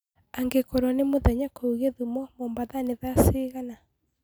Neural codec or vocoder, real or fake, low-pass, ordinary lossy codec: none; real; none; none